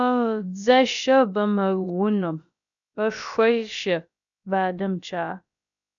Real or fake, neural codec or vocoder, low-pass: fake; codec, 16 kHz, about 1 kbps, DyCAST, with the encoder's durations; 7.2 kHz